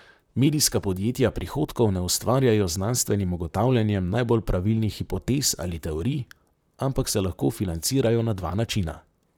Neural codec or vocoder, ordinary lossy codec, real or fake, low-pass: vocoder, 44.1 kHz, 128 mel bands, Pupu-Vocoder; none; fake; none